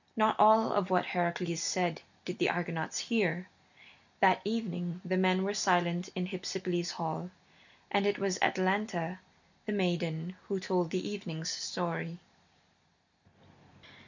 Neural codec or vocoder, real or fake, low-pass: none; real; 7.2 kHz